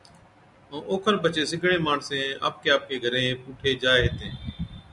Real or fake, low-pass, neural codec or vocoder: real; 10.8 kHz; none